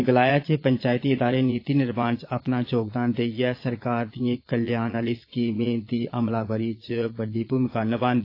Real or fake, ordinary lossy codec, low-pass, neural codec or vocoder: fake; AAC, 32 kbps; 5.4 kHz; vocoder, 22.05 kHz, 80 mel bands, Vocos